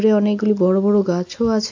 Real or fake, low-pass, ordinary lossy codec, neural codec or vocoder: real; 7.2 kHz; AAC, 48 kbps; none